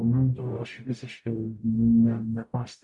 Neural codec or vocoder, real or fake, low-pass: codec, 44.1 kHz, 0.9 kbps, DAC; fake; 10.8 kHz